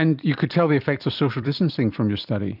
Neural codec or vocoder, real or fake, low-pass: vocoder, 44.1 kHz, 128 mel bands every 256 samples, BigVGAN v2; fake; 5.4 kHz